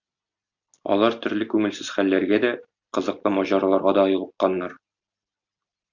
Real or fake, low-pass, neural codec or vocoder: real; 7.2 kHz; none